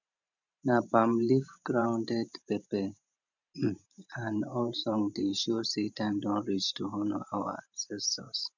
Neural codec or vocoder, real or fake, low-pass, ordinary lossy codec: vocoder, 44.1 kHz, 128 mel bands every 512 samples, BigVGAN v2; fake; 7.2 kHz; none